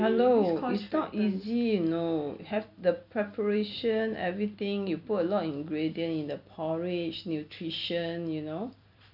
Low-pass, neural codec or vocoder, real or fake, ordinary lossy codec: 5.4 kHz; vocoder, 44.1 kHz, 128 mel bands every 256 samples, BigVGAN v2; fake; none